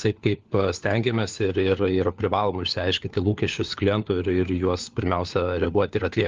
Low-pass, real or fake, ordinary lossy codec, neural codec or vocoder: 7.2 kHz; fake; Opus, 24 kbps; codec, 16 kHz, 4 kbps, FunCodec, trained on LibriTTS, 50 frames a second